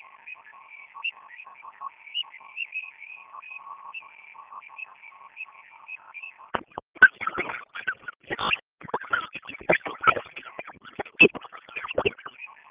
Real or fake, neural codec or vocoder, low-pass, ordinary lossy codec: real; none; 3.6 kHz; Opus, 16 kbps